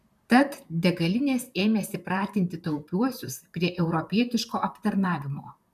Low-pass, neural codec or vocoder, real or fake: 14.4 kHz; vocoder, 44.1 kHz, 128 mel bands, Pupu-Vocoder; fake